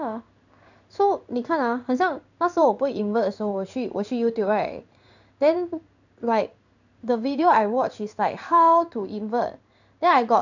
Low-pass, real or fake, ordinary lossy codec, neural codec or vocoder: 7.2 kHz; fake; none; codec, 16 kHz in and 24 kHz out, 1 kbps, XY-Tokenizer